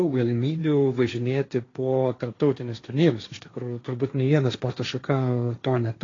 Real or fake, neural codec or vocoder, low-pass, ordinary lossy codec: fake; codec, 16 kHz, 1.1 kbps, Voila-Tokenizer; 7.2 kHz; AAC, 32 kbps